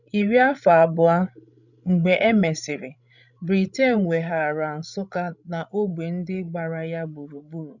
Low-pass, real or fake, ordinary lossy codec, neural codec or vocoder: 7.2 kHz; fake; none; codec, 16 kHz, 16 kbps, FreqCodec, larger model